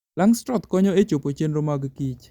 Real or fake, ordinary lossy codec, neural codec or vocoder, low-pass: real; Opus, 64 kbps; none; 19.8 kHz